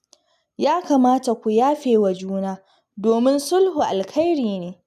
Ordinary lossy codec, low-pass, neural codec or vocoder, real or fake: none; 14.4 kHz; none; real